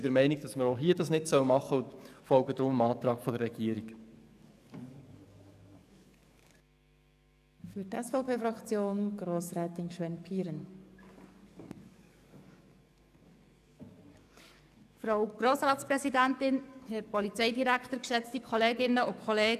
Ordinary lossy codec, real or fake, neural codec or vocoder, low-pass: none; fake; codec, 44.1 kHz, 7.8 kbps, DAC; 14.4 kHz